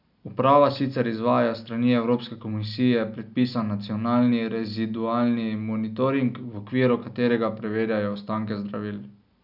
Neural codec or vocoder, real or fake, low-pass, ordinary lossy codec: none; real; 5.4 kHz; none